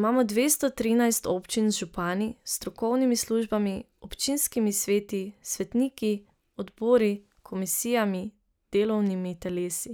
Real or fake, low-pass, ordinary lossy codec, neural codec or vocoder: real; none; none; none